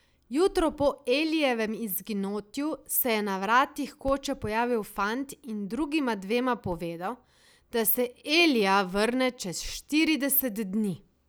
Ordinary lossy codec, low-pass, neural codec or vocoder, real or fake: none; none; none; real